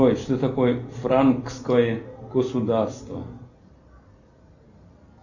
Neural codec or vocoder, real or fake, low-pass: none; real; 7.2 kHz